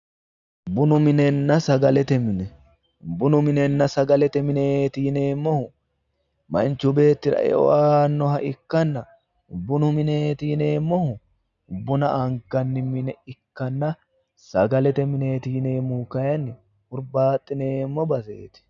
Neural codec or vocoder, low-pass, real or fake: none; 7.2 kHz; real